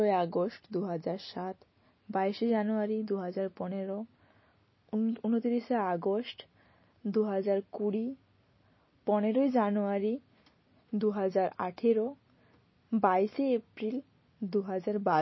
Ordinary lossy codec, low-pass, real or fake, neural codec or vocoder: MP3, 24 kbps; 7.2 kHz; real; none